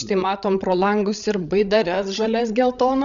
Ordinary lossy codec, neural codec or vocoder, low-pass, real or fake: AAC, 96 kbps; codec, 16 kHz, 16 kbps, FreqCodec, larger model; 7.2 kHz; fake